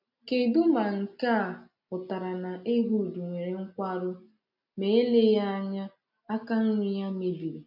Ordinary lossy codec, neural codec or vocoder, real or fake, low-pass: none; none; real; 5.4 kHz